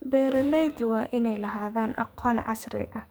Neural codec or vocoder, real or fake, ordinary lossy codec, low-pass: codec, 44.1 kHz, 2.6 kbps, SNAC; fake; none; none